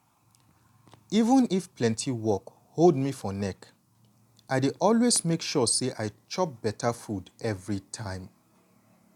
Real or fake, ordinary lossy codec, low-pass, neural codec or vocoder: real; none; 19.8 kHz; none